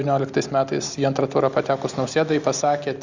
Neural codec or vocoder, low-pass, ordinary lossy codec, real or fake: none; 7.2 kHz; Opus, 64 kbps; real